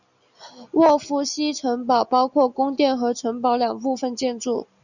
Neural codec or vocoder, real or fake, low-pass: none; real; 7.2 kHz